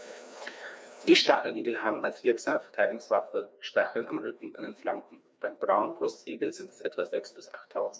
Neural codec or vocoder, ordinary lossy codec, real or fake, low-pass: codec, 16 kHz, 1 kbps, FreqCodec, larger model; none; fake; none